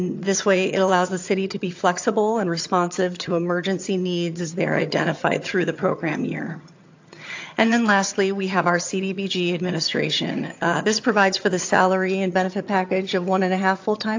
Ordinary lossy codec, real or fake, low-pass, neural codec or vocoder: AAC, 48 kbps; fake; 7.2 kHz; vocoder, 22.05 kHz, 80 mel bands, HiFi-GAN